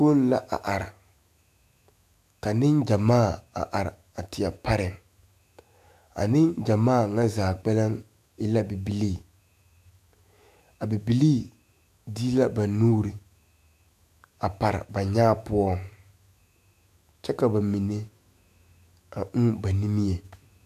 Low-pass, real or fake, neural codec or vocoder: 14.4 kHz; fake; autoencoder, 48 kHz, 128 numbers a frame, DAC-VAE, trained on Japanese speech